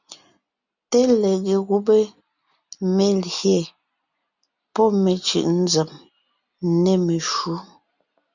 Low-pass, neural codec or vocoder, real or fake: 7.2 kHz; none; real